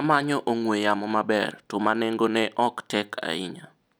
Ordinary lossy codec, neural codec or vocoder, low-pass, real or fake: none; none; none; real